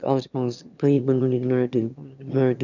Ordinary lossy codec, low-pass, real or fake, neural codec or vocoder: none; 7.2 kHz; fake; autoencoder, 22.05 kHz, a latent of 192 numbers a frame, VITS, trained on one speaker